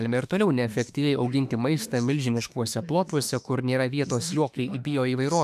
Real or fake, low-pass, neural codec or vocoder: fake; 14.4 kHz; autoencoder, 48 kHz, 32 numbers a frame, DAC-VAE, trained on Japanese speech